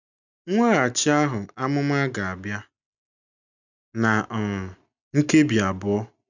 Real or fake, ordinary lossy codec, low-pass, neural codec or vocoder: real; none; 7.2 kHz; none